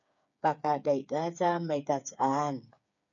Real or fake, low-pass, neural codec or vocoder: fake; 7.2 kHz; codec, 16 kHz, 8 kbps, FreqCodec, smaller model